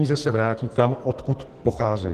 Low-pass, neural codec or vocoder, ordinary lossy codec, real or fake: 14.4 kHz; codec, 44.1 kHz, 2.6 kbps, SNAC; Opus, 16 kbps; fake